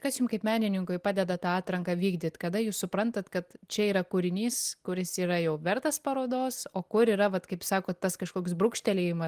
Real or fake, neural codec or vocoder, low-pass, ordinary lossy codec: real; none; 14.4 kHz; Opus, 24 kbps